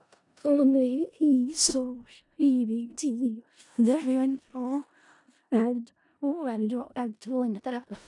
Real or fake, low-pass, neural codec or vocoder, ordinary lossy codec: fake; 10.8 kHz; codec, 16 kHz in and 24 kHz out, 0.4 kbps, LongCat-Audio-Codec, four codebook decoder; none